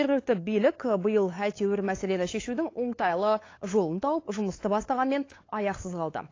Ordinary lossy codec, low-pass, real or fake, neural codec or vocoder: AAC, 32 kbps; 7.2 kHz; fake; codec, 16 kHz, 8 kbps, FunCodec, trained on LibriTTS, 25 frames a second